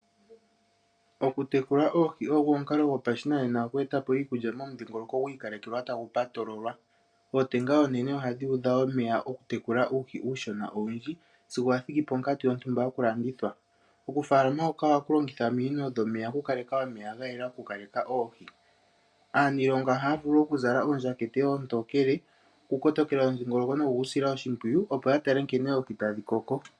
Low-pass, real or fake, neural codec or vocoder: 9.9 kHz; real; none